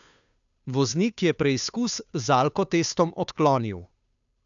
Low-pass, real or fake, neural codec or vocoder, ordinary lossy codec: 7.2 kHz; fake; codec, 16 kHz, 2 kbps, FunCodec, trained on Chinese and English, 25 frames a second; none